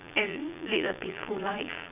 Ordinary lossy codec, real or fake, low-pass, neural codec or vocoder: none; fake; 3.6 kHz; vocoder, 22.05 kHz, 80 mel bands, Vocos